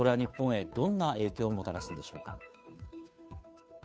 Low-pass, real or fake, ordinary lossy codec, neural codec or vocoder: none; fake; none; codec, 16 kHz, 2 kbps, FunCodec, trained on Chinese and English, 25 frames a second